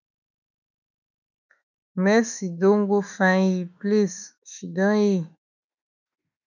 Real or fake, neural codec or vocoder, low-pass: fake; autoencoder, 48 kHz, 32 numbers a frame, DAC-VAE, trained on Japanese speech; 7.2 kHz